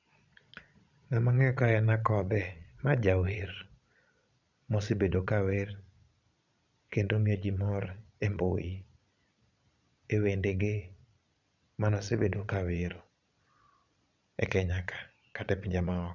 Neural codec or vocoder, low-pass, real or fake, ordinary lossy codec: vocoder, 22.05 kHz, 80 mel bands, Vocos; 7.2 kHz; fake; none